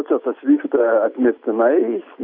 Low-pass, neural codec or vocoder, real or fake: 5.4 kHz; none; real